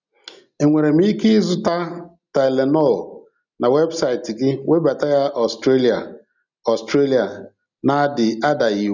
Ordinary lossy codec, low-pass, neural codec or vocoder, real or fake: none; 7.2 kHz; none; real